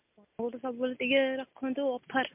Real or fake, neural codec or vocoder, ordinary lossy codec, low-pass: real; none; MP3, 32 kbps; 3.6 kHz